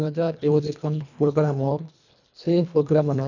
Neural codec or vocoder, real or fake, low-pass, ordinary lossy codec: codec, 24 kHz, 1.5 kbps, HILCodec; fake; 7.2 kHz; none